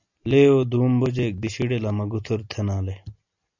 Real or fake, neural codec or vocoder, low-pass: real; none; 7.2 kHz